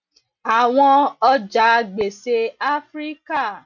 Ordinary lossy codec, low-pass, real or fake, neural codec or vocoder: none; 7.2 kHz; real; none